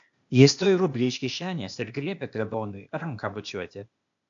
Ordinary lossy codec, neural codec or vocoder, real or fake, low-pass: AAC, 64 kbps; codec, 16 kHz, 0.8 kbps, ZipCodec; fake; 7.2 kHz